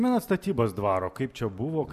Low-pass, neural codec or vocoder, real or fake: 14.4 kHz; none; real